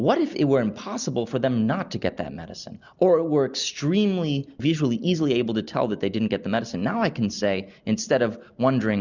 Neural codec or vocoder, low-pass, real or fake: none; 7.2 kHz; real